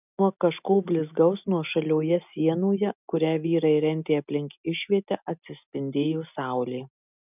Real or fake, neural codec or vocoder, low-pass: real; none; 3.6 kHz